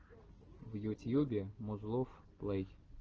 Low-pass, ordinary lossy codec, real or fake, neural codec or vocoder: 7.2 kHz; Opus, 16 kbps; real; none